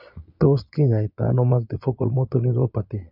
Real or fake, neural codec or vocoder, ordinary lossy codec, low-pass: fake; vocoder, 22.05 kHz, 80 mel bands, Vocos; none; 5.4 kHz